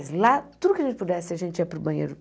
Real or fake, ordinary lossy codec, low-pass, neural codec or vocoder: real; none; none; none